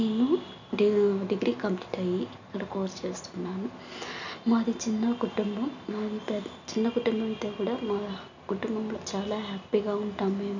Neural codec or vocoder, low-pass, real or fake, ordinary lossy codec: none; 7.2 kHz; real; none